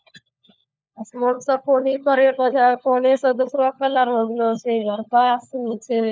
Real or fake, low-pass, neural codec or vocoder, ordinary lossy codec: fake; none; codec, 16 kHz, 4 kbps, FunCodec, trained on LibriTTS, 50 frames a second; none